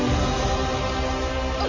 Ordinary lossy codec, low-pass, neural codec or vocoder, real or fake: none; 7.2 kHz; none; real